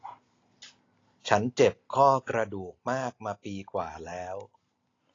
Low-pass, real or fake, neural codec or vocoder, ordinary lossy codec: 7.2 kHz; real; none; AAC, 32 kbps